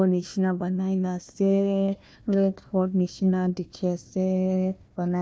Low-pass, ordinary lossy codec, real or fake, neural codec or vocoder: none; none; fake; codec, 16 kHz, 1 kbps, FunCodec, trained on Chinese and English, 50 frames a second